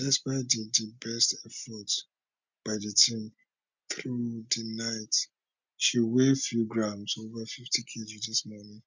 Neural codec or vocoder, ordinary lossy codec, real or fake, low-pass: none; MP3, 64 kbps; real; 7.2 kHz